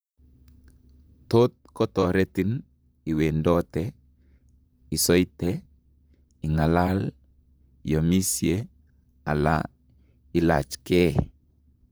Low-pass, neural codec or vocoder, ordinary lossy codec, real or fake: none; vocoder, 44.1 kHz, 128 mel bands, Pupu-Vocoder; none; fake